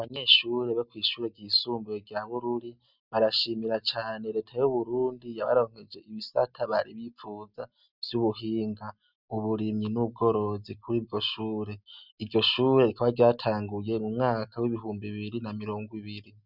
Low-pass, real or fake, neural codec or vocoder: 5.4 kHz; real; none